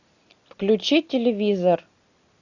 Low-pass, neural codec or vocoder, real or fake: 7.2 kHz; none; real